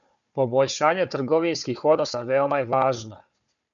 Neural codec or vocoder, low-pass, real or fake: codec, 16 kHz, 4 kbps, FunCodec, trained on Chinese and English, 50 frames a second; 7.2 kHz; fake